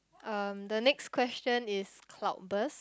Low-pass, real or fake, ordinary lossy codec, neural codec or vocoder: none; real; none; none